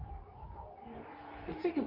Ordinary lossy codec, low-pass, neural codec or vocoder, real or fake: none; 5.4 kHz; codec, 24 kHz, 0.9 kbps, WavTokenizer, medium speech release version 2; fake